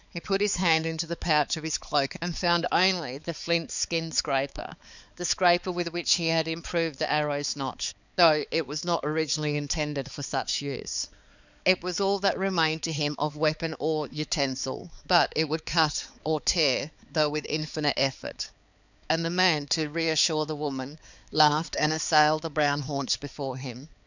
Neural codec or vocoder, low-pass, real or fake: codec, 16 kHz, 4 kbps, X-Codec, HuBERT features, trained on balanced general audio; 7.2 kHz; fake